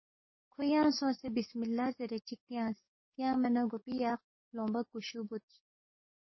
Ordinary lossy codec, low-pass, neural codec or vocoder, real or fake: MP3, 24 kbps; 7.2 kHz; codec, 44.1 kHz, 7.8 kbps, DAC; fake